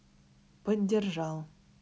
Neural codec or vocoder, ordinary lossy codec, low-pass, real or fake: none; none; none; real